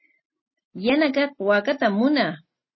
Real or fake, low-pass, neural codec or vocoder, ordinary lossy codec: real; 7.2 kHz; none; MP3, 24 kbps